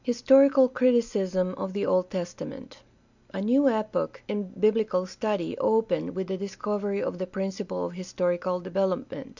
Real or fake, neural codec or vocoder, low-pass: real; none; 7.2 kHz